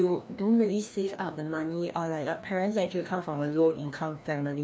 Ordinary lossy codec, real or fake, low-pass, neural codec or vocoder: none; fake; none; codec, 16 kHz, 1 kbps, FreqCodec, larger model